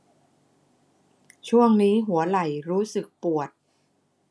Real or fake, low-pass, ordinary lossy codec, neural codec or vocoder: real; none; none; none